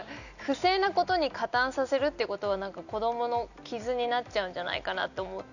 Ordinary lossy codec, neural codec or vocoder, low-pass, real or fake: none; none; 7.2 kHz; real